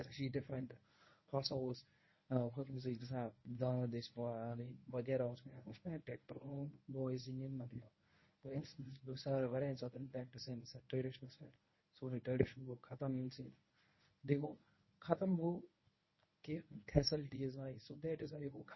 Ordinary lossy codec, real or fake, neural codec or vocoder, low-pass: MP3, 24 kbps; fake; codec, 24 kHz, 0.9 kbps, WavTokenizer, medium speech release version 1; 7.2 kHz